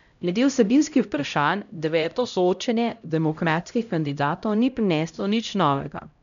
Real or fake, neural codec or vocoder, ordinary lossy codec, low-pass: fake; codec, 16 kHz, 0.5 kbps, X-Codec, HuBERT features, trained on LibriSpeech; none; 7.2 kHz